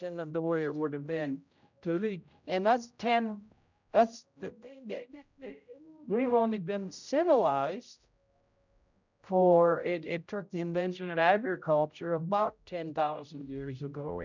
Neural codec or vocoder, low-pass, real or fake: codec, 16 kHz, 0.5 kbps, X-Codec, HuBERT features, trained on general audio; 7.2 kHz; fake